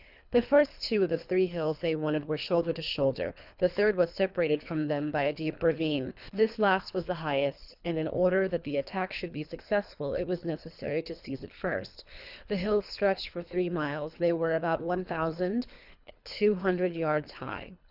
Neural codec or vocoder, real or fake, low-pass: codec, 24 kHz, 3 kbps, HILCodec; fake; 5.4 kHz